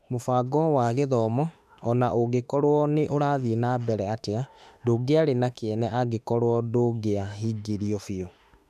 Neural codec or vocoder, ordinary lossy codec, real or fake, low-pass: autoencoder, 48 kHz, 32 numbers a frame, DAC-VAE, trained on Japanese speech; none; fake; 14.4 kHz